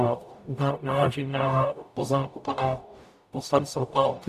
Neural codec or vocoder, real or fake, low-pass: codec, 44.1 kHz, 0.9 kbps, DAC; fake; 14.4 kHz